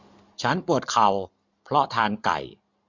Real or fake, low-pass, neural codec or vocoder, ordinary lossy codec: real; 7.2 kHz; none; MP3, 64 kbps